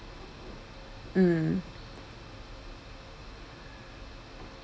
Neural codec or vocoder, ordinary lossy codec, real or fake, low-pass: none; none; real; none